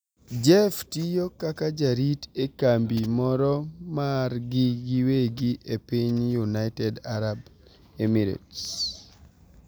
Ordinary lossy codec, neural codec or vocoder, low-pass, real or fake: none; none; none; real